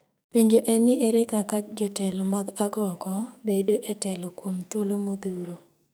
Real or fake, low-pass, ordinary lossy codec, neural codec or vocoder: fake; none; none; codec, 44.1 kHz, 2.6 kbps, SNAC